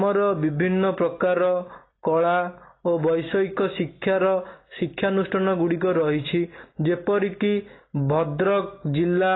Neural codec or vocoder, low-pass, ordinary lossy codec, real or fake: none; 7.2 kHz; AAC, 16 kbps; real